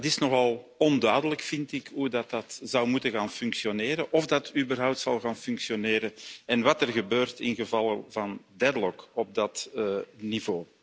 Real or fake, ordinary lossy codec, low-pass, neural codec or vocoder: real; none; none; none